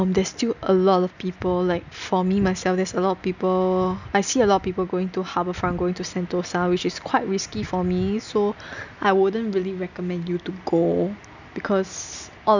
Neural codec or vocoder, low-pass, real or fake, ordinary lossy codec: none; 7.2 kHz; real; none